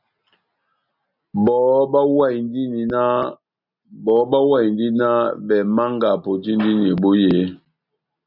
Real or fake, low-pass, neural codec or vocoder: real; 5.4 kHz; none